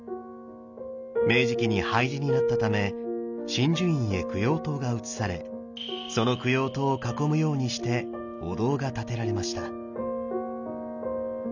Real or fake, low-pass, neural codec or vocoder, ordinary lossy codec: real; 7.2 kHz; none; none